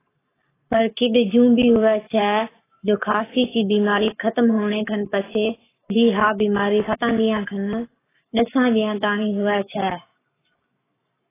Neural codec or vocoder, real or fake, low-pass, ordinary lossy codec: codec, 44.1 kHz, 7.8 kbps, Pupu-Codec; fake; 3.6 kHz; AAC, 16 kbps